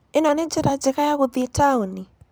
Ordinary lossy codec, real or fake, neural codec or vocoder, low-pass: none; fake; vocoder, 44.1 kHz, 128 mel bands every 256 samples, BigVGAN v2; none